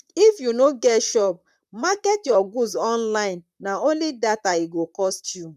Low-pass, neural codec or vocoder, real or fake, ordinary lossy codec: 14.4 kHz; vocoder, 44.1 kHz, 128 mel bands, Pupu-Vocoder; fake; none